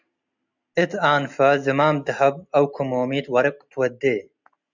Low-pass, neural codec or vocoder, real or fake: 7.2 kHz; none; real